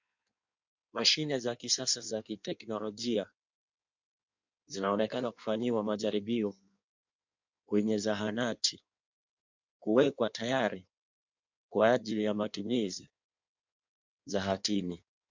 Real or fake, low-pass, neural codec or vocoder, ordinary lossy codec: fake; 7.2 kHz; codec, 16 kHz in and 24 kHz out, 1.1 kbps, FireRedTTS-2 codec; MP3, 64 kbps